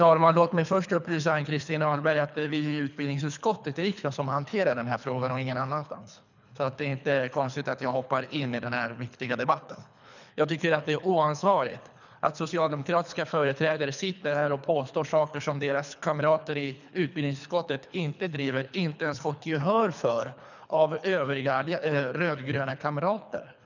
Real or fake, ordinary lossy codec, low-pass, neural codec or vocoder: fake; none; 7.2 kHz; codec, 24 kHz, 3 kbps, HILCodec